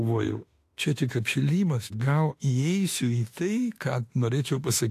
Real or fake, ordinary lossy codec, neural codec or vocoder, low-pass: fake; AAC, 64 kbps; autoencoder, 48 kHz, 32 numbers a frame, DAC-VAE, trained on Japanese speech; 14.4 kHz